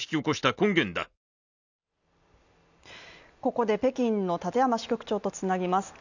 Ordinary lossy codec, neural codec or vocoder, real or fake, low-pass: none; none; real; 7.2 kHz